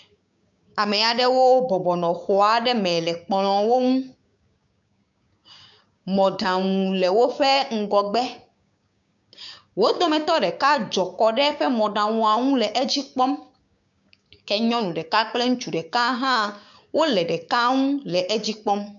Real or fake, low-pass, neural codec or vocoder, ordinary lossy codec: fake; 7.2 kHz; codec, 16 kHz, 6 kbps, DAC; MP3, 96 kbps